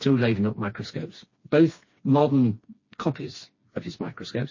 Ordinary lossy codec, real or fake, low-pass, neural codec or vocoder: MP3, 32 kbps; fake; 7.2 kHz; codec, 16 kHz, 2 kbps, FreqCodec, smaller model